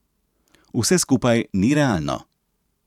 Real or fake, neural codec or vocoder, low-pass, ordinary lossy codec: fake; vocoder, 44.1 kHz, 128 mel bands every 512 samples, BigVGAN v2; 19.8 kHz; none